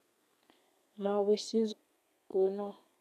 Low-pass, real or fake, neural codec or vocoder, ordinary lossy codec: 14.4 kHz; fake; codec, 32 kHz, 1.9 kbps, SNAC; none